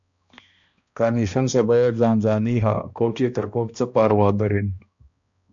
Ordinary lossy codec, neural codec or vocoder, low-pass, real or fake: MP3, 64 kbps; codec, 16 kHz, 1 kbps, X-Codec, HuBERT features, trained on balanced general audio; 7.2 kHz; fake